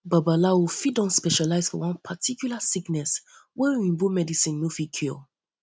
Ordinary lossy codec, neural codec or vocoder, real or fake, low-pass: none; none; real; none